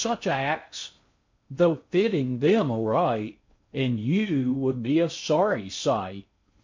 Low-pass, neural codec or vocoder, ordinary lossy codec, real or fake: 7.2 kHz; codec, 16 kHz in and 24 kHz out, 0.6 kbps, FocalCodec, streaming, 2048 codes; MP3, 48 kbps; fake